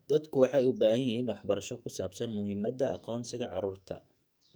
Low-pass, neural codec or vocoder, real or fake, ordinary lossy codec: none; codec, 44.1 kHz, 2.6 kbps, SNAC; fake; none